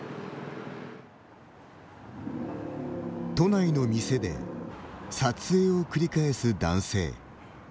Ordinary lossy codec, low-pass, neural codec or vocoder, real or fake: none; none; none; real